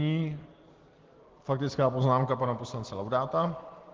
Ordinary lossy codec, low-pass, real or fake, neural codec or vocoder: Opus, 16 kbps; 7.2 kHz; real; none